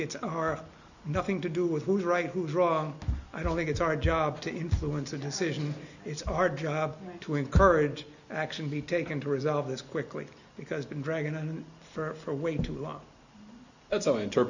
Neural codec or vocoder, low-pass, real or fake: none; 7.2 kHz; real